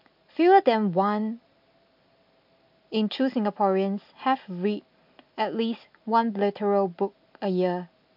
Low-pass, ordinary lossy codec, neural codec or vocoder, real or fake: 5.4 kHz; MP3, 48 kbps; none; real